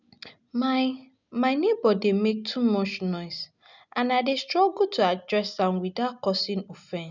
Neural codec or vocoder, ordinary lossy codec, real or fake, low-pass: none; none; real; 7.2 kHz